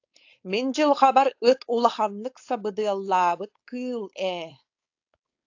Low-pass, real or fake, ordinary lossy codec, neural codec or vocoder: 7.2 kHz; fake; AAC, 48 kbps; codec, 16 kHz, 4.8 kbps, FACodec